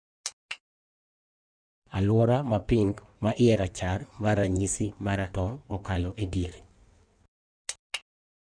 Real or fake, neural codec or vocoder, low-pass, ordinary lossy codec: fake; codec, 16 kHz in and 24 kHz out, 1.1 kbps, FireRedTTS-2 codec; 9.9 kHz; none